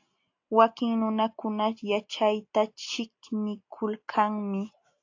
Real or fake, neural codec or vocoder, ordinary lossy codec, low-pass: real; none; AAC, 48 kbps; 7.2 kHz